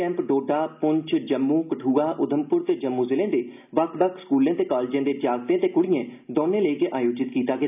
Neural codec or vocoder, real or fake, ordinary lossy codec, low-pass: none; real; none; 3.6 kHz